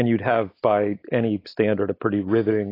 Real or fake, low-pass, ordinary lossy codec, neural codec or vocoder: real; 5.4 kHz; AAC, 24 kbps; none